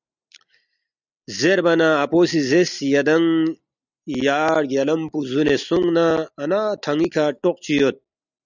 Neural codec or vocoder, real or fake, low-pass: none; real; 7.2 kHz